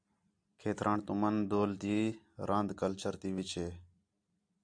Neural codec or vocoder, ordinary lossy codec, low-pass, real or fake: none; AAC, 64 kbps; 9.9 kHz; real